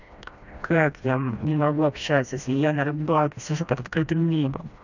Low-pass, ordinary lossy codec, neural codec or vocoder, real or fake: 7.2 kHz; none; codec, 16 kHz, 1 kbps, FreqCodec, smaller model; fake